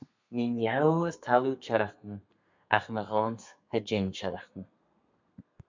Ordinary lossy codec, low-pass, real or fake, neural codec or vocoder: MP3, 64 kbps; 7.2 kHz; fake; codec, 32 kHz, 1.9 kbps, SNAC